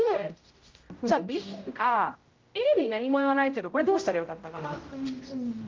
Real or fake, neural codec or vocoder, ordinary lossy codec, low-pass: fake; codec, 16 kHz, 0.5 kbps, X-Codec, HuBERT features, trained on general audio; Opus, 24 kbps; 7.2 kHz